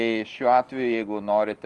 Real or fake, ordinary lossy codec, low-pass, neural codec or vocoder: real; Opus, 24 kbps; 10.8 kHz; none